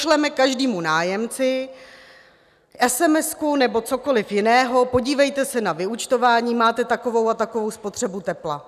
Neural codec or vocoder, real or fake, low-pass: none; real; 14.4 kHz